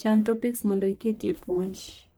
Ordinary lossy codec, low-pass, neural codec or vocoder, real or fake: none; none; codec, 44.1 kHz, 1.7 kbps, Pupu-Codec; fake